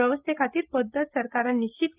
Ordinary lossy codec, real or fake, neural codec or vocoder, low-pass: Opus, 32 kbps; real; none; 3.6 kHz